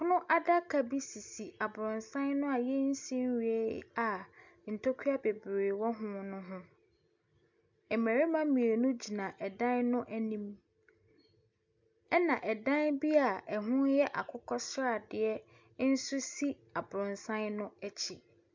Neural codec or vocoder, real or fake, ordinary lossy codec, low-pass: none; real; MP3, 64 kbps; 7.2 kHz